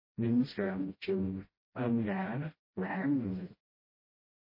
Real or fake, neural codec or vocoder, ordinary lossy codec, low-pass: fake; codec, 16 kHz, 0.5 kbps, FreqCodec, smaller model; MP3, 24 kbps; 5.4 kHz